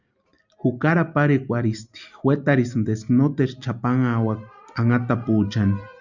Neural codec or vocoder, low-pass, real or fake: none; 7.2 kHz; real